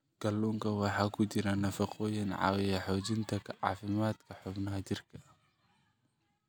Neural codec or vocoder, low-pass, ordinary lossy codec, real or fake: none; none; none; real